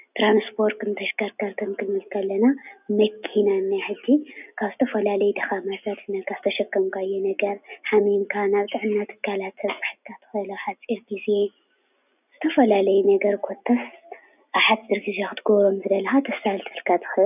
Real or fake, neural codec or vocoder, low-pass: real; none; 3.6 kHz